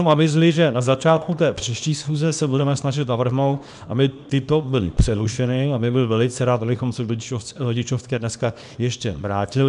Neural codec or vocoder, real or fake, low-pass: codec, 24 kHz, 0.9 kbps, WavTokenizer, small release; fake; 10.8 kHz